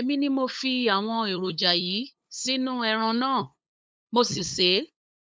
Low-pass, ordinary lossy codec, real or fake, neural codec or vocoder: none; none; fake; codec, 16 kHz, 8 kbps, FunCodec, trained on LibriTTS, 25 frames a second